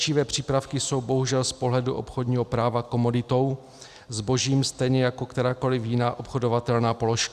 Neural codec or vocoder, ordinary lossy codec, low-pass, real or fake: none; Opus, 64 kbps; 14.4 kHz; real